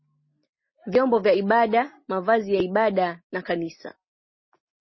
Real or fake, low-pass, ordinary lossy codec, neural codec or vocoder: real; 7.2 kHz; MP3, 24 kbps; none